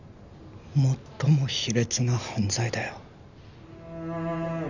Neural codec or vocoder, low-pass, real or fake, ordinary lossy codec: none; 7.2 kHz; real; none